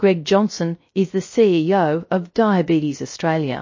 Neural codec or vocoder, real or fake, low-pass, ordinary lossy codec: codec, 16 kHz, 0.3 kbps, FocalCodec; fake; 7.2 kHz; MP3, 32 kbps